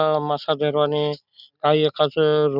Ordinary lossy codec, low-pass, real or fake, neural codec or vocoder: none; 5.4 kHz; real; none